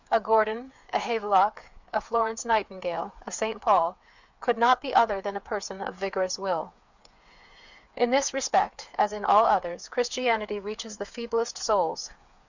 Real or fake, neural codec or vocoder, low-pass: fake; codec, 16 kHz, 8 kbps, FreqCodec, smaller model; 7.2 kHz